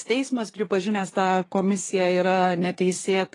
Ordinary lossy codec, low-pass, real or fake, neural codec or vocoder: AAC, 32 kbps; 10.8 kHz; fake; codec, 24 kHz, 1 kbps, SNAC